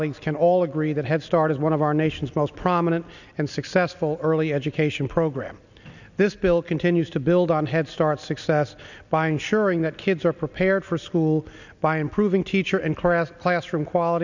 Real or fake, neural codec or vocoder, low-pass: real; none; 7.2 kHz